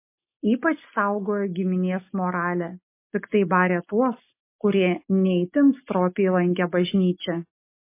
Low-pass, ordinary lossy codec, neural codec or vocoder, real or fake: 3.6 kHz; MP3, 24 kbps; none; real